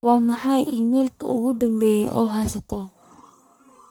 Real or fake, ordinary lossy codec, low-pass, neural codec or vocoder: fake; none; none; codec, 44.1 kHz, 1.7 kbps, Pupu-Codec